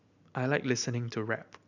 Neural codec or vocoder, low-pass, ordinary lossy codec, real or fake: none; 7.2 kHz; none; real